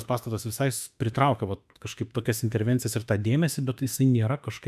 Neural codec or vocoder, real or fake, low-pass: autoencoder, 48 kHz, 32 numbers a frame, DAC-VAE, trained on Japanese speech; fake; 14.4 kHz